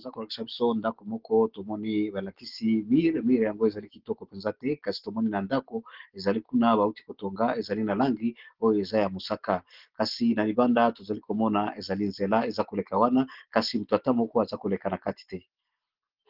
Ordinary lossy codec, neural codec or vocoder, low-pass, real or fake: Opus, 16 kbps; none; 5.4 kHz; real